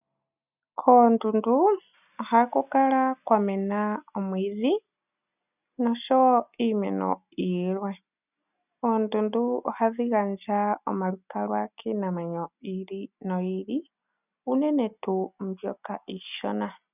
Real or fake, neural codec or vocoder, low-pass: real; none; 3.6 kHz